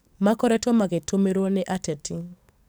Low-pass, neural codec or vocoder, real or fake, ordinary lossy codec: none; codec, 44.1 kHz, 7.8 kbps, DAC; fake; none